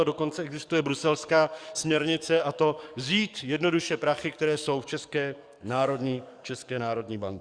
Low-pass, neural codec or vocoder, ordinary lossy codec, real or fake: 9.9 kHz; codec, 44.1 kHz, 7.8 kbps, DAC; Opus, 64 kbps; fake